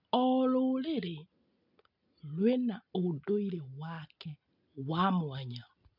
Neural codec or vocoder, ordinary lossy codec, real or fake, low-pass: none; none; real; 5.4 kHz